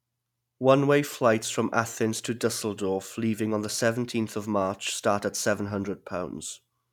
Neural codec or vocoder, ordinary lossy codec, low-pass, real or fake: none; none; 19.8 kHz; real